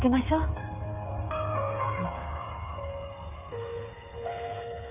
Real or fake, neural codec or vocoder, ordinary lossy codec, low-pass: fake; codec, 16 kHz, 8 kbps, FreqCodec, smaller model; none; 3.6 kHz